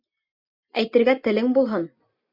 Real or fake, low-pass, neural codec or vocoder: real; 5.4 kHz; none